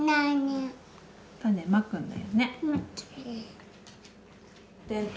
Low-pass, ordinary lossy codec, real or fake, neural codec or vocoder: none; none; real; none